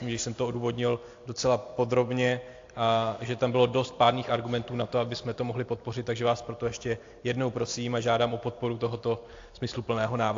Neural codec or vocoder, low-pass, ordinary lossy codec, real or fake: none; 7.2 kHz; AAC, 48 kbps; real